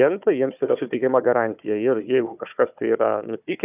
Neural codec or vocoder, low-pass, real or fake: codec, 16 kHz, 4 kbps, FunCodec, trained on Chinese and English, 50 frames a second; 3.6 kHz; fake